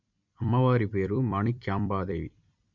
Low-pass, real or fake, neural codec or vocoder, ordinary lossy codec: 7.2 kHz; fake; vocoder, 44.1 kHz, 128 mel bands every 256 samples, BigVGAN v2; Opus, 64 kbps